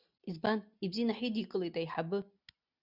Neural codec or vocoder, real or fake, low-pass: none; real; 5.4 kHz